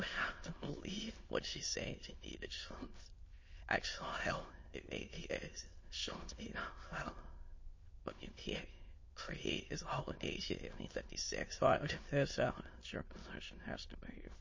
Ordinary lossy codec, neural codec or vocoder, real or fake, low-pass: MP3, 32 kbps; autoencoder, 22.05 kHz, a latent of 192 numbers a frame, VITS, trained on many speakers; fake; 7.2 kHz